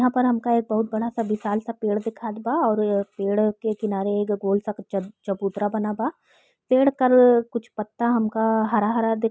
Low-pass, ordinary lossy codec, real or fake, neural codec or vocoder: none; none; real; none